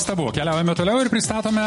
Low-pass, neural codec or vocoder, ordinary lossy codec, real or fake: 14.4 kHz; none; MP3, 48 kbps; real